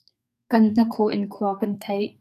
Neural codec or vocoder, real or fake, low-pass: codec, 32 kHz, 1.9 kbps, SNAC; fake; 14.4 kHz